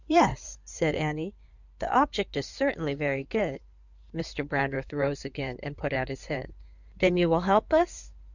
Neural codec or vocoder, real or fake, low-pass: codec, 16 kHz in and 24 kHz out, 2.2 kbps, FireRedTTS-2 codec; fake; 7.2 kHz